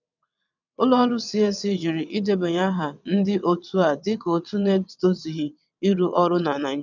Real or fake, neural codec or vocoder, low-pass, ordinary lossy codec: fake; vocoder, 22.05 kHz, 80 mel bands, WaveNeXt; 7.2 kHz; none